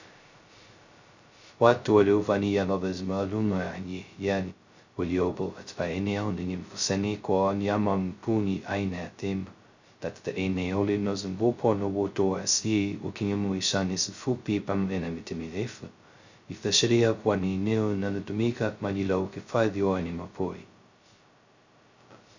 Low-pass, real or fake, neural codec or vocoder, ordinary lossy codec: 7.2 kHz; fake; codec, 16 kHz, 0.2 kbps, FocalCodec; Opus, 64 kbps